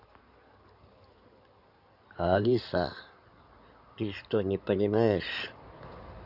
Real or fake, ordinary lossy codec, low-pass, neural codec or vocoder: fake; none; 5.4 kHz; codec, 16 kHz in and 24 kHz out, 2.2 kbps, FireRedTTS-2 codec